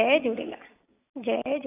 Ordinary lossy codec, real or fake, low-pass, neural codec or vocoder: AAC, 16 kbps; real; 3.6 kHz; none